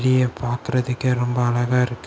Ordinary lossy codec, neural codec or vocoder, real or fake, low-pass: none; none; real; none